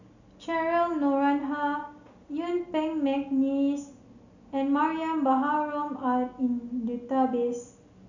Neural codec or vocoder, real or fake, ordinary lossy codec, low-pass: none; real; none; 7.2 kHz